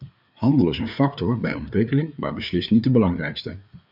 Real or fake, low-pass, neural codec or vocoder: fake; 5.4 kHz; codec, 16 kHz, 4 kbps, FreqCodec, larger model